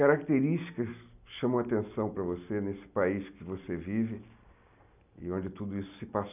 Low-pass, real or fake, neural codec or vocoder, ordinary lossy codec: 3.6 kHz; real; none; none